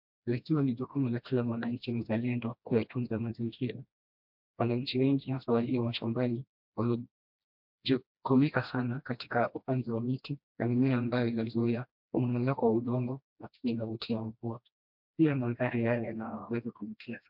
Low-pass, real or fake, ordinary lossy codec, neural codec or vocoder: 5.4 kHz; fake; MP3, 48 kbps; codec, 16 kHz, 1 kbps, FreqCodec, smaller model